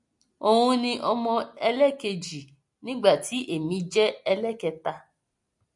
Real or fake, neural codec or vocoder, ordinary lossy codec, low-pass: real; none; MP3, 48 kbps; 10.8 kHz